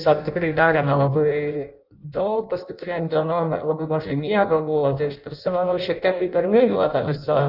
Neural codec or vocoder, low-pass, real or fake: codec, 16 kHz in and 24 kHz out, 0.6 kbps, FireRedTTS-2 codec; 5.4 kHz; fake